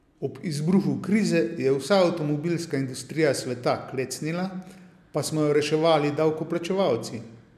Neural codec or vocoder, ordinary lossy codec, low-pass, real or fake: none; none; 14.4 kHz; real